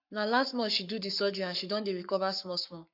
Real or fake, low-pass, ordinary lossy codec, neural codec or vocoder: fake; 5.4 kHz; none; codec, 44.1 kHz, 7.8 kbps, Pupu-Codec